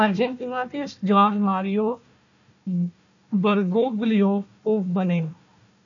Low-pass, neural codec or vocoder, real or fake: 7.2 kHz; codec, 16 kHz, 1 kbps, FunCodec, trained on Chinese and English, 50 frames a second; fake